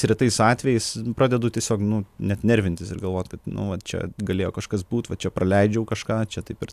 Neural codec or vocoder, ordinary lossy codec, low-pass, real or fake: none; AAC, 96 kbps; 14.4 kHz; real